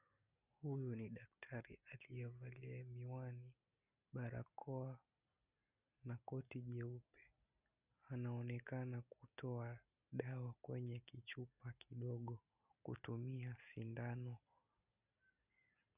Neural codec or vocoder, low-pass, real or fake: none; 3.6 kHz; real